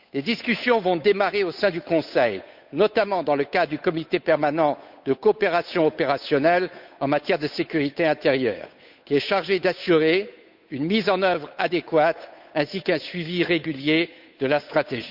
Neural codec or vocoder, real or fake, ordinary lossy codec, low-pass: codec, 16 kHz, 8 kbps, FunCodec, trained on Chinese and English, 25 frames a second; fake; none; 5.4 kHz